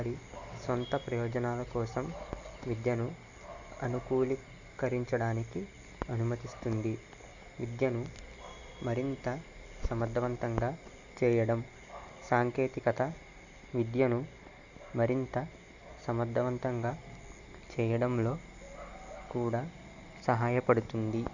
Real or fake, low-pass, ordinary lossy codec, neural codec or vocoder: real; 7.2 kHz; Opus, 64 kbps; none